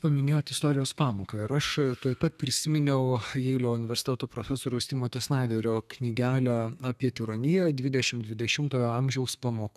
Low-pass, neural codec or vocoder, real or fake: 14.4 kHz; codec, 32 kHz, 1.9 kbps, SNAC; fake